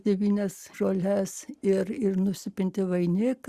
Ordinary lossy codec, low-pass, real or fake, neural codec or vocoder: Opus, 64 kbps; 14.4 kHz; real; none